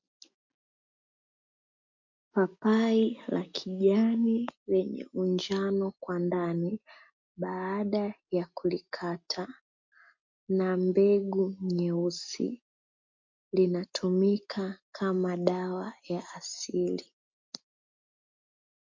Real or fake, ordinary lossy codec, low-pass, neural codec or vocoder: real; MP3, 48 kbps; 7.2 kHz; none